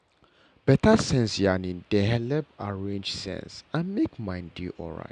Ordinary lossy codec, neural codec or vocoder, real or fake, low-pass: none; none; real; 9.9 kHz